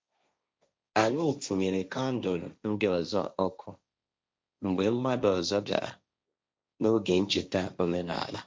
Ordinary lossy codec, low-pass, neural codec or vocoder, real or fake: none; none; codec, 16 kHz, 1.1 kbps, Voila-Tokenizer; fake